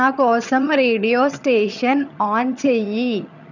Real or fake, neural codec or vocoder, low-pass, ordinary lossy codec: fake; vocoder, 22.05 kHz, 80 mel bands, HiFi-GAN; 7.2 kHz; none